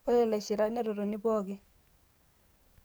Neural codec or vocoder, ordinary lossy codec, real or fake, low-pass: codec, 44.1 kHz, 7.8 kbps, DAC; none; fake; none